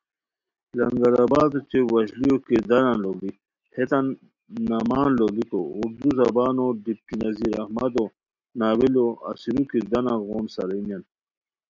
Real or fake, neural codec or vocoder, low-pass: real; none; 7.2 kHz